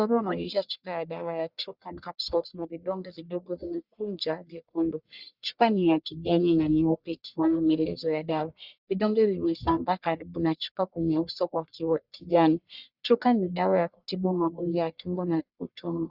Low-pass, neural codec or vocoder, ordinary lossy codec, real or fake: 5.4 kHz; codec, 44.1 kHz, 1.7 kbps, Pupu-Codec; Opus, 64 kbps; fake